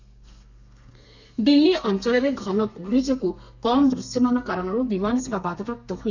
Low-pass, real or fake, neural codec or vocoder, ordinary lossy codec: 7.2 kHz; fake; codec, 32 kHz, 1.9 kbps, SNAC; none